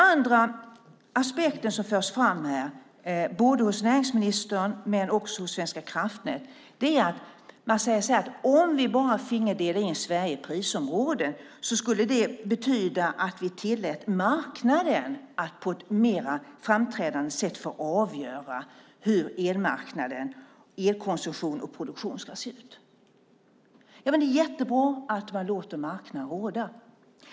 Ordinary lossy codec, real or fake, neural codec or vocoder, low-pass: none; real; none; none